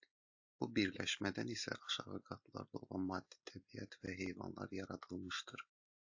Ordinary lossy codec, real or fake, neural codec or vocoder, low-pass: AAC, 48 kbps; real; none; 7.2 kHz